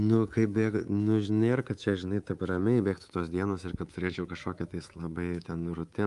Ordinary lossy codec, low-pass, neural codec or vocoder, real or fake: Opus, 32 kbps; 10.8 kHz; codec, 24 kHz, 3.1 kbps, DualCodec; fake